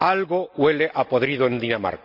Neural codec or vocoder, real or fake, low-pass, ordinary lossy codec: none; real; 5.4 kHz; none